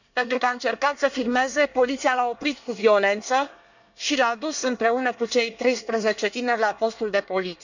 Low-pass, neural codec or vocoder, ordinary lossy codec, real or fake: 7.2 kHz; codec, 24 kHz, 1 kbps, SNAC; none; fake